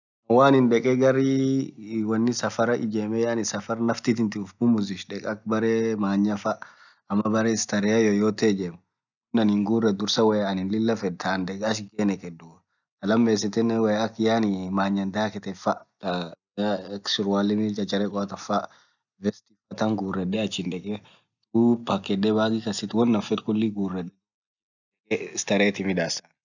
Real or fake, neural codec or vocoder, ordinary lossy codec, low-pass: real; none; none; 7.2 kHz